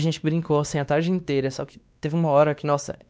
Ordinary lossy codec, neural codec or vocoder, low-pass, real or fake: none; codec, 16 kHz, 2 kbps, X-Codec, WavLM features, trained on Multilingual LibriSpeech; none; fake